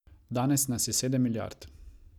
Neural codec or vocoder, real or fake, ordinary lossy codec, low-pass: none; real; none; 19.8 kHz